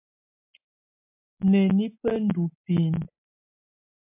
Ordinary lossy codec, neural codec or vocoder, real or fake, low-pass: MP3, 32 kbps; none; real; 3.6 kHz